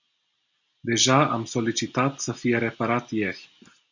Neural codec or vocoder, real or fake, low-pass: none; real; 7.2 kHz